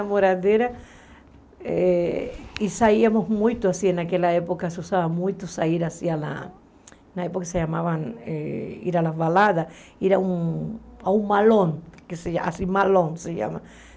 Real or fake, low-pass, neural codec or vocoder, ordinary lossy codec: real; none; none; none